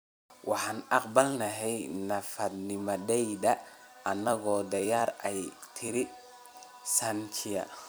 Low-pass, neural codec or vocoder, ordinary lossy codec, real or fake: none; vocoder, 44.1 kHz, 128 mel bands every 256 samples, BigVGAN v2; none; fake